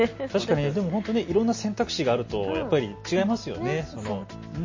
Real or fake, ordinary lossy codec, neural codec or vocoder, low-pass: real; MP3, 32 kbps; none; 7.2 kHz